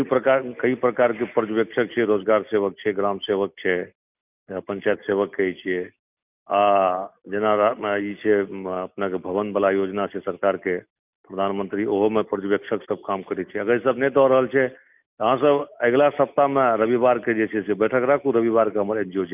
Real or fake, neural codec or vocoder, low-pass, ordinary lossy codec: real; none; 3.6 kHz; none